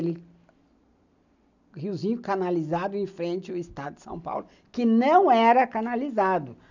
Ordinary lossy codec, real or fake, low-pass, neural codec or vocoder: none; real; 7.2 kHz; none